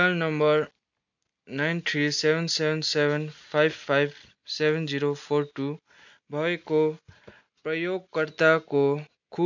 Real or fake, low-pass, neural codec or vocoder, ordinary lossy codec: real; 7.2 kHz; none; none